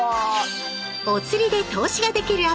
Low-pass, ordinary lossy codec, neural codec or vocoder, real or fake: none; none; none; real